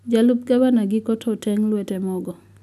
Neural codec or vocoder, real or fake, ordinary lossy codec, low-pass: none; real; none; 14.4 kHz